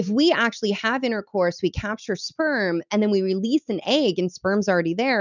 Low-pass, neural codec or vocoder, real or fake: 7.2 kHz; none; real